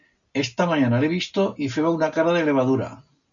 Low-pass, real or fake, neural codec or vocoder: 7.2 kHz; real; none